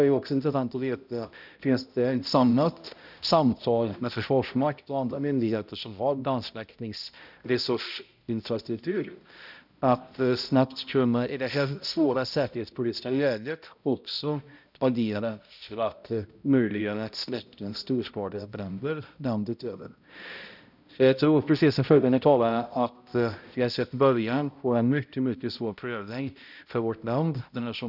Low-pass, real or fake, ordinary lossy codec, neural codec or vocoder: 5.4 kHz; fake; none; codec, 16 kHz, 0.5 kbps, X-Codec, HuBERT features, trained on balanced general audio